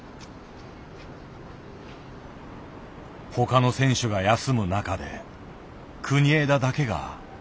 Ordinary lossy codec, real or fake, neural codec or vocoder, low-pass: none; real; none; none